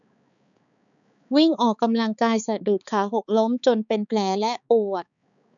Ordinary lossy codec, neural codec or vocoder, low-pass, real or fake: none; codec, 16 kHz, 4 kbps, X-Codec, HuBERT features, trained on balanced general audio; 7.2 kHz; fake